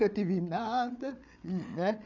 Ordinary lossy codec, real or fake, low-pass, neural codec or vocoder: none; fake; 7.2 kHz; codec, 16 kHz, 8 kbps, FreqCodec, larger model